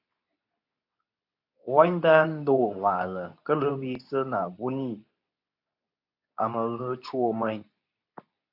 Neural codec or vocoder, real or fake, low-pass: codec, 24 kHz, 0.9 kbps, WavTokenizer, medium speech release version 2; fake; 5.4 kHz